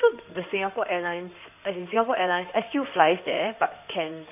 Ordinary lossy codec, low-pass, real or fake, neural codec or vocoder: none; 3.6 kHz; fake; codec, 16 kHz in and 24 kHz out, 2.2 kbps, FireRedTTS-2 codec